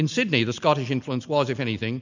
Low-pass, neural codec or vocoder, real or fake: 7.2 kHz; none; real